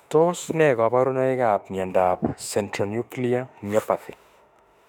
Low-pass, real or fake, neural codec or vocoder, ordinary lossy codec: 19.8 kHz; fake; autoencoder, 48 kHz, 32 numbers a frame, DAC-VAE, trained on Japanese speech; none